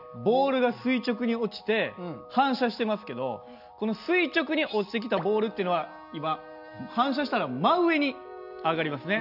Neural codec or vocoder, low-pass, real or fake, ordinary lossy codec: none; 5.4 kHz; real; none